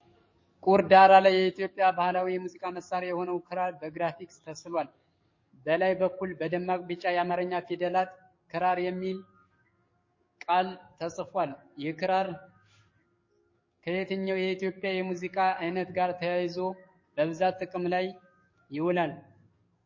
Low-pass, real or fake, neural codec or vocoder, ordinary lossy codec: 7.2 kHz; fake; codec, 44.1 kHz, 7.8 kbps, DAC; MP3, 32 kbps